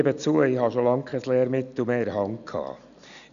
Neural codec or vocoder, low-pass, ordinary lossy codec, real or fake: none; 7.2 kHz; none; real